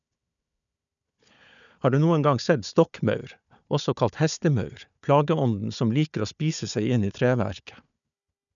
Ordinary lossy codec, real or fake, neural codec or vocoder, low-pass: none; fake; codec, 16 kHz, 4 kbps, FunCodec, trained on Chinese and English, 50 frames a second; 7.2 kHz